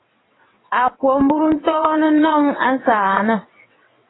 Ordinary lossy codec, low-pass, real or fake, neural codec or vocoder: AAC, 16 kbps; 7.2 kHz; fake; vocoder, 22.05 kHz, 80 mel bands, WaveNeXt